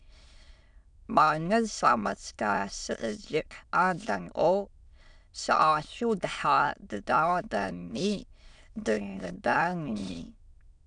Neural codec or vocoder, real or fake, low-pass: autoencoder, 22.05 kHz, a latent of 192 numbers a frame, VITS, trained on many speakers; fake; 9.9 kHz